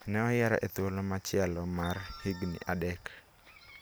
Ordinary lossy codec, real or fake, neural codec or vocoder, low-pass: none; real; none; none